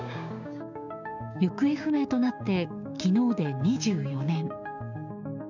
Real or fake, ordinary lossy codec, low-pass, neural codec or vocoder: fake; none; 7.2 kHz; codec, 16 kHz, 6 kbps, DAC